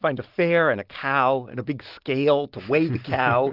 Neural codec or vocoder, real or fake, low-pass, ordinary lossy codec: none; real; 5.4 kHz; Opus, 16 kbps